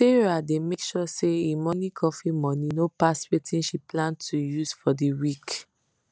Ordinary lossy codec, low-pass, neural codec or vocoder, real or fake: none; none; none; real